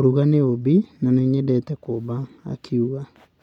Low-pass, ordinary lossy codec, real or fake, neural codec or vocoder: 19.8 kHz; none; real; none